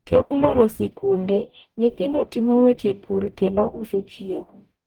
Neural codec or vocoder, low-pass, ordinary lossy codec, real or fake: codec, 44.1 kHz, 0.9 kbps, DAC; 19.8 kHz; Opus, 32 kbps; fake